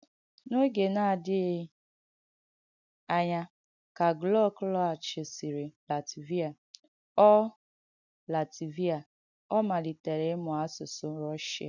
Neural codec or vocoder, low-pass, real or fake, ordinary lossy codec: none; 7.2 kHz; real; none